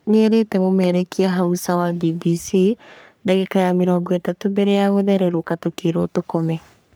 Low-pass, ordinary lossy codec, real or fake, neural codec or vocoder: none; none; fake; codec, 44.1 kHz, 3.4 kbps, Pupu-Codec